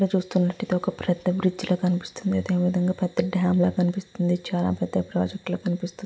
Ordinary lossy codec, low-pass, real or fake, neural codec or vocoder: none; none; real; none